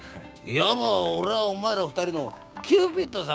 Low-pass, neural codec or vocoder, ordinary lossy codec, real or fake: none; codec, 16 kHz, 6 kbps, DAC; none; fake